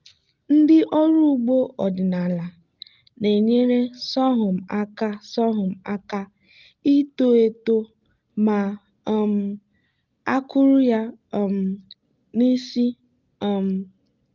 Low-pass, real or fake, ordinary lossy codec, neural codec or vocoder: 7.2 kHz; real; Opus, 24 kbps; none